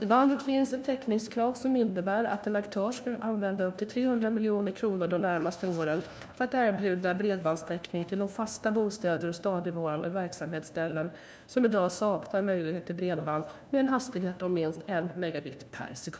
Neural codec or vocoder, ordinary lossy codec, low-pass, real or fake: codec, 16 kHz, 1 kbps, FunCodec, trained on LibriTTS, 50 frames a second; none; none; fake